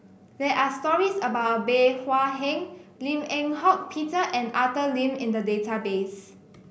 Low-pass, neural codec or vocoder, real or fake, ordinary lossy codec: none; none; real; none